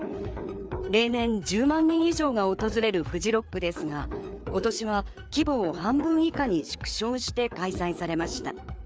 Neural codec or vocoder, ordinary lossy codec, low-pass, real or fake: codec, 16 kHz, 4 kbps, FreqCodec, larger model; none; none; fake